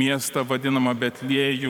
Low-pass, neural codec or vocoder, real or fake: 19.8 kHz; vocoder, 44.1 kHz, 128 mel bands every 512 samples, BigVGAN v2; fake